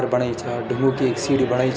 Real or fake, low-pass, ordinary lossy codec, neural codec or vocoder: real; none; none; none